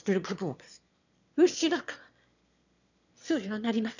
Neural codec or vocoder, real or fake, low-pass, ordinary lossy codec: autoencoder, 22.05 kHz, a latent of 192 numbers a frame, VITS, trained on one speaker; fake; 7.2 kHz; none